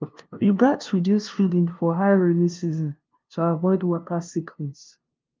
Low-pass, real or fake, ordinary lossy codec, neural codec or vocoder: 7.2 kHz; fake; Opus, 24 kbps; codec, 16 kHz, 0.5 kbps, FunCodec, trained on LibriTTS, 25 frames a second